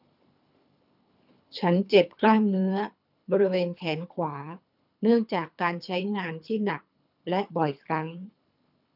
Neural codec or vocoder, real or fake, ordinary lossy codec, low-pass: codec, 24 kHz, 3 kbps, HILCodec; fake; none; 5.4 kHz